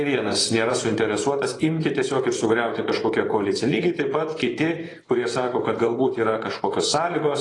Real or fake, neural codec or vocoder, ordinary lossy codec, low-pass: fake; vocoder, 24 kHz, 100 mel bands, Vocos; AAC, 32 kbps; 10.8 kHz